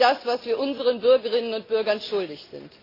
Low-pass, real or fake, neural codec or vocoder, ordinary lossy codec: 5.4 kHz; real; none; AAC, 24 kbps